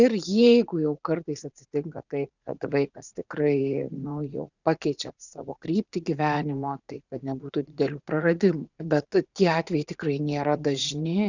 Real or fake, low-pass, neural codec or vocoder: fake; 7.2 kHz; vocoder, 44.1 kHz, 80 mel bands, Vocos